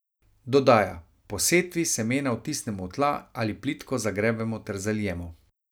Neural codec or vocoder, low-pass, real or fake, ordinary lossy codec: none; none; real; none